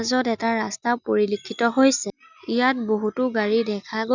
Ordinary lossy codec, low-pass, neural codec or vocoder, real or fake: none; 7.2 kHz; none; real